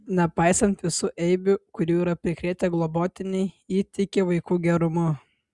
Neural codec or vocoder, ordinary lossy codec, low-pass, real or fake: none; Opus, 64 kbps; 10.8 kHz; real